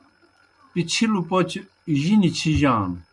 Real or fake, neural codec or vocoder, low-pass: real; none; 10.8 kHz